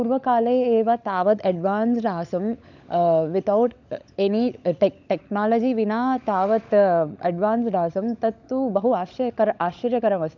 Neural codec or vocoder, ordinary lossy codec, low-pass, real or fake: codec, 16 kHz, 16 kbps, FunCodec, trained on LibriTTS, 50 frames a second; none; 7.2 kHz; fake